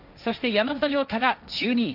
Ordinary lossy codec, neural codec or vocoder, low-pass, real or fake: none; codec, 16 kHz, 1.1 kbps, Voila-Tokenizer; 5.4 kHz; fake